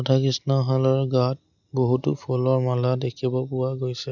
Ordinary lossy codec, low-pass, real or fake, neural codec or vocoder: none; 7.2 kHz; real; none